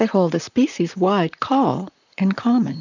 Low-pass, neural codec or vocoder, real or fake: 7.2 kHz; vocoder, 44.1 kHz, 128 mel bands, Pupu-Vocoder; fake